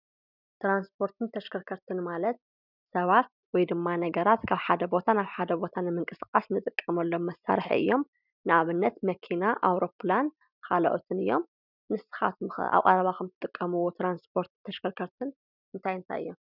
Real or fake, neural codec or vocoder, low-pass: real; none; 5.4 kHz